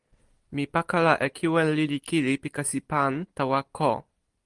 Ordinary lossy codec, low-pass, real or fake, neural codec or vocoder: Opus, 24 kbps; 10.8 kHz; real; none